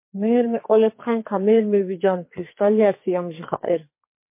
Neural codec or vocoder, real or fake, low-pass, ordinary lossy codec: codec, 44.1 kHz, 2.6 kbps, SNAC; fake; 3.6 kHz; MP3, 24 kbps